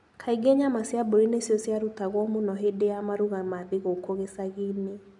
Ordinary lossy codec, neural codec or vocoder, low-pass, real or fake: none; none; 10.8 kHz; real